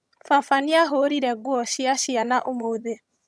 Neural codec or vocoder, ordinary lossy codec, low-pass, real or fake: vocoder, 22.05 kHz, 80 mel bands, HiFi-GAN; none; none; fake